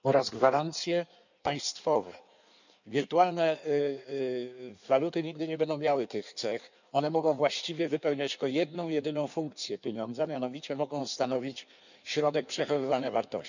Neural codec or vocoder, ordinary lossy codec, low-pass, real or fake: codec, 16 kHz in and 24 kHz out, 1.1 kbps, FireRedTTS-2 codec; none; 7.2 kHz; fake